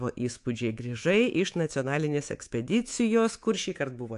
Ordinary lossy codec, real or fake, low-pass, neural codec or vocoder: AAC, 64 kbps; fake; 10.8 kHz; codec, 24 kHz, 3.1 kbps, DualCodec